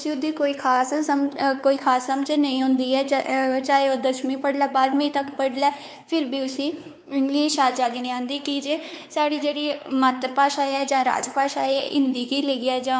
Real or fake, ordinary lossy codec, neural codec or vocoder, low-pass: fake; none; codec, 16 kHz, 4 kbps, X-Codec, WavLM features, trained on Multilingual LibriSpeech; none